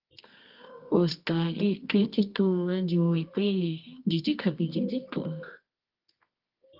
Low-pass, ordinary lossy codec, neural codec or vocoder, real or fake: 5.4 kHz; Opus, 32 kbps; codec, 24 kHz, 0.9 kbps, WavTokenizer, medium music audio release; fake